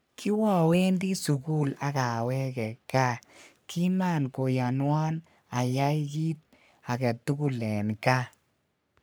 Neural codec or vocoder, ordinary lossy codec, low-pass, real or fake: codec, 44.1 kHz, 3.4 kbps, Pupu-Codec; none; none; fake